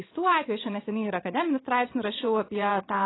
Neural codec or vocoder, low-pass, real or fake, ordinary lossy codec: vocoder, 22.05 kHz, 80 mel bands, Vocos; 7.2 kHz; fake; AAC, 16 kbps